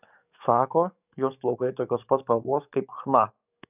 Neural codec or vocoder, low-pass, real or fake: codec, 44.1 kHz, 7.8 kbps, DAC; 3.6 kHz; fake